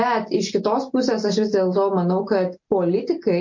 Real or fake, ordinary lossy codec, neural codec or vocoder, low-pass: real; MP3, 48 kbps; none; 7.2 kHz